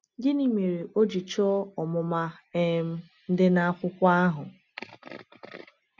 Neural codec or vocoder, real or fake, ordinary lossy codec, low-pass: none; real; none; 7.2 kHz